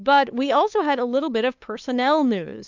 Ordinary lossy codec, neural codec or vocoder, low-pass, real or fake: MP3, 64 kbps; codec, 16 kHz, 2 kbps, FunCodec, trained on LibriTTS, 25 frames a second; 7.2 kHz; fake